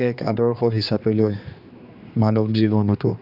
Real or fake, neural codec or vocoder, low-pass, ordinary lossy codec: fake; codec, 16 kHz, 2 kbps, X-Codec, HuBERT features, trained on general audio; 5.4 kHz; none